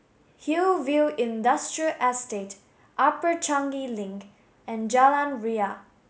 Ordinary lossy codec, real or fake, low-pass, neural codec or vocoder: none; real; none; none